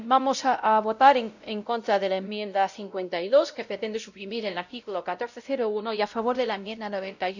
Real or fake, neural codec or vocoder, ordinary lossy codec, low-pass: fake; codec, 16 kHz, 0.5 kbps, X-Codec, WavLM features, trained on Multilingual LibriSpeech; none; 7.2 kHz